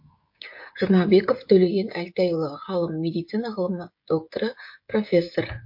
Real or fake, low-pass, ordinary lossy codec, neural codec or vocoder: fake; 5.4 kHz; MP3, 32 kbps; codec, 16 kHz, 16 kbps, FreqCodec, smaller model